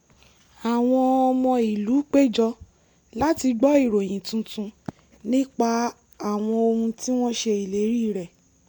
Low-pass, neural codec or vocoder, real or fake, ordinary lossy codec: 19.8 kHz; none; real; MP3, 96 kbps